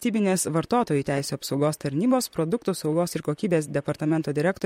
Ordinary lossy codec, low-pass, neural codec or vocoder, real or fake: MP3, 64 kbps; 19.8 kHz; vocoder, 44.1 kHz, 128 mel bands, Pupu-Vocoder; fake